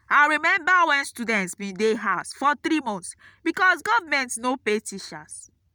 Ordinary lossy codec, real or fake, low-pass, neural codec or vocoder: none; real; none; none